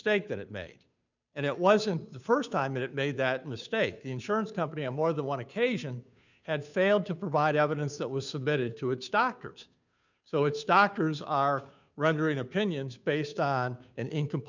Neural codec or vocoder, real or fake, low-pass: codec, 16 kHz, 2 kbps, FunCodec, trained on Chinese and English, 25 frames a second; fake; 7.2 kHz